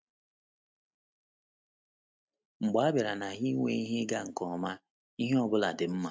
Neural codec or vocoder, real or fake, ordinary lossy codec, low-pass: none; real; none; none